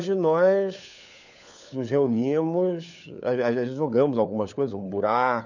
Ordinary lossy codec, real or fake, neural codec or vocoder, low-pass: none; fake; codec, 16 kHz, 4 kbps, FunCodec, trained on LibriTTS, 50 frames a second; 7.2 kHz